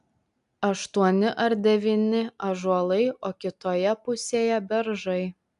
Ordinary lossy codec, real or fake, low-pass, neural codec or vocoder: AAC, 96 kbps; real; 10.8 kHz; none